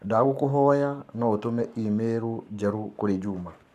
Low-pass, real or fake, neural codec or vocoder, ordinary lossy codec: 14.4 kHz; fake; codec, 44.1 kHz, 7.8 kbps, Pupu-Codec; none